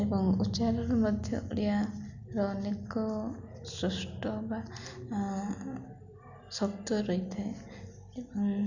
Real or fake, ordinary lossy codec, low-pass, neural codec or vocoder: real; none; 7.2 kHz; none